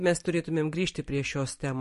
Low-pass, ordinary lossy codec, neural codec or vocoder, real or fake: 14.4 kHz; MP3, 48 kbps; vocoder, 44.1 kHz, 128 mel bands every 512 samples, BigVGAN v2; fake